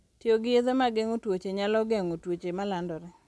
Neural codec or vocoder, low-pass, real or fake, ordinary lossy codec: none; none; real; none